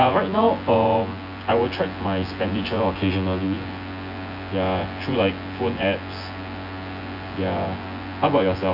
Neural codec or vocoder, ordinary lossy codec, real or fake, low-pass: vocoder, 24 kHz, 100 mel bands, Vocos; none; fake; 5.4 kHz